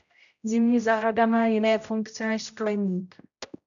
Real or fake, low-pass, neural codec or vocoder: fake; 7.2 kHz; codec, 16 kHz, 0.5 kbps, X-Codec, HuBERT features, trained on general audio